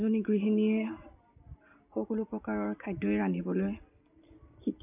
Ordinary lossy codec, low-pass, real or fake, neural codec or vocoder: none; 3.6 kHz; real; none